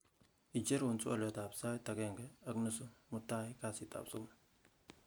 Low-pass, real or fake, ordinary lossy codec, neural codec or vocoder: none; real; none; none